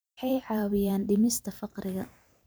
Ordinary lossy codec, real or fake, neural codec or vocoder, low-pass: none; fake; vocoder, 44.1 kHz, 128 mel bands every 512 samples, BigVGAN v2; none